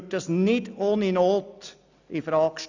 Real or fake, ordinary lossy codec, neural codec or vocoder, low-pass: real; none; none; 7.2 kHz